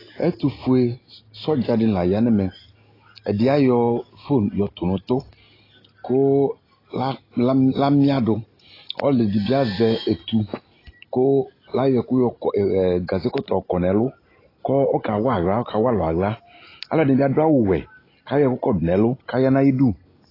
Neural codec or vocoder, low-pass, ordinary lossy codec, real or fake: none; 5.4 kHz; AAC, 24 kbps; real